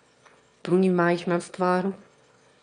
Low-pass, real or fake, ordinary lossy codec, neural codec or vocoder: 9.9 kHz; fake; none; autoencoder, 22.05 kHz, a latent of 192 numbers a frame, VITS, trained on one speaker